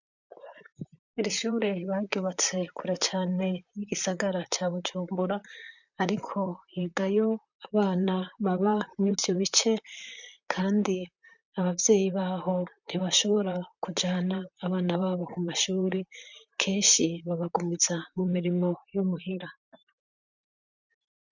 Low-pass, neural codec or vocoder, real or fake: 7.2 kHz; vocoder, 44.1 kHz, 128 mel bands, Pupu-Vocoder; fake